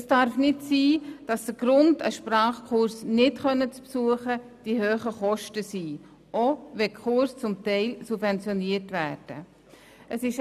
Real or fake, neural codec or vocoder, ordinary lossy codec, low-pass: real; none; none; 14.4 kHz